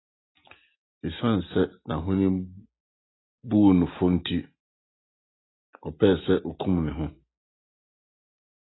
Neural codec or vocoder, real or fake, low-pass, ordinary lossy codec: none; real; 7.2 kHz; AAC, 16 kbps